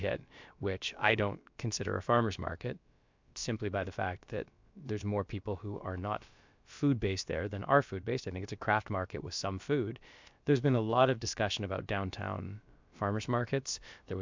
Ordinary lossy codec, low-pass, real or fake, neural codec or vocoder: MP3, 64 kbps; 7.2 kHz; fake; codec, 16 kHz, about 1 kbps, DyCAST, with the encoder's durations